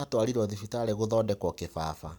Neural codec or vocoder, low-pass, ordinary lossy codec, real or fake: none; none; none; real